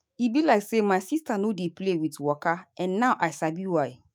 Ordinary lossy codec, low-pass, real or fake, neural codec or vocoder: none; none; fake; autoencoder, 48 kHz, 128 numbers a frame, DAC-VAE, trained on Japanese speech